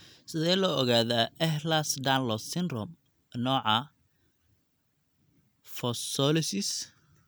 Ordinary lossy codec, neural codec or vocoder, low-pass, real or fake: none; none; none; real